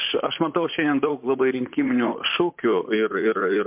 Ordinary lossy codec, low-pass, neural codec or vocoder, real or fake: MP3, 32 kbps; 3.6 kHz; vocoder, 44.1 kHz, 128 mel bands, Pupu-Vocoder; fake